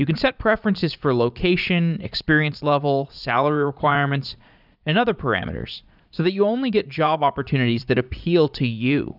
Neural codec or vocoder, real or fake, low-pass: vocoder, 44.1 kHz, 80 mel bands, Vocos; fake; 5.4 kHz